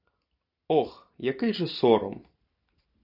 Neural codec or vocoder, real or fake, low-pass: none; real; 5.4 kHz